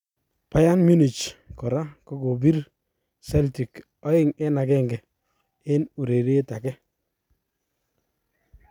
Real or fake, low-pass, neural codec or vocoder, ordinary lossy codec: real; 19.8 kHz; none; none